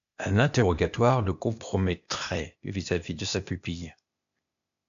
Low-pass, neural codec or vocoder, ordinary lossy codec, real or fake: 7.2 kHz; codec, 16 kHz, 0.8 kbps, ZipCodec; MP3, 64 kbps; fake